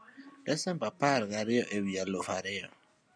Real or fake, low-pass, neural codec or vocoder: real; 9.9 kHz; none